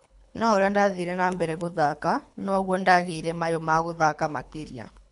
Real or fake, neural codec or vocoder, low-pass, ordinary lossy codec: fake; codec, 24 kHz, 3 kbps, HILCodec; 10.8 kHz; none